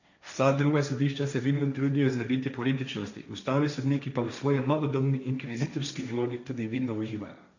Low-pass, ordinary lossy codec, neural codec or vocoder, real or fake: 7.2 kHz; none; codec, 16 kHz, 1.1 kbps, Voila-Tokenizer; fake